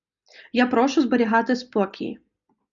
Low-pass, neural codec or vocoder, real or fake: 7.2 kHz; none; real